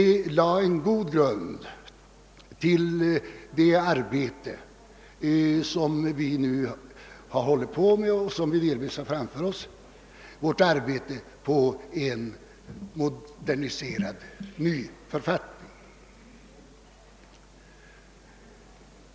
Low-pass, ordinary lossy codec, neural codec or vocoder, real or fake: none; none; none; real